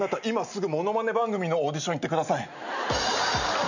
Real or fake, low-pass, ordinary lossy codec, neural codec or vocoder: real; 7.2 kHz; none; none